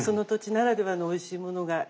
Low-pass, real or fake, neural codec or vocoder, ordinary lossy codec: none; real; none; none